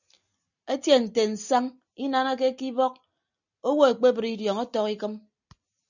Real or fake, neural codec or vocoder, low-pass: real; none; 7.2 kHz